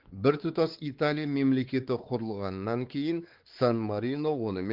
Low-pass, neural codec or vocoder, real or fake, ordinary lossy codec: 5.4 kHz; codec, 16 kHz, 4 kbps, X-Codec, WavLM features, trained on Multilingual LibriSpeech; fake; Opus, 16 kbps